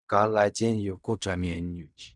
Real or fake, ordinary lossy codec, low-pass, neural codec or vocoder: fake; none; 10.8 kHz; codec, 16 kHz in and 24 kHz out, 0.4 kbps, LongCat-Audio-Codec, fine tuned four codebook decoder